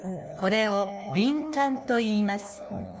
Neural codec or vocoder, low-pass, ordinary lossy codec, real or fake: codec, 16 kHz, 1 kbps, FunCodec, trained on LibriTTS, 50 frames a second; none; none; fake